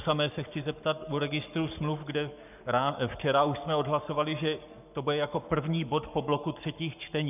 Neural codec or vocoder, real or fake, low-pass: codec, 44.1 kHz, 7.8 kbps, Pupu-Codec; fake; 3.6 kHz